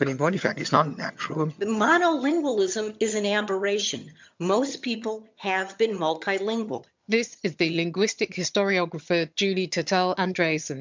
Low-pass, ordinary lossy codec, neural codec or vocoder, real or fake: 7.2 kHz; MP3, 64 kbps; vocoder, 22.05 kHz, 80 mel bands, HiFi-GAN; fake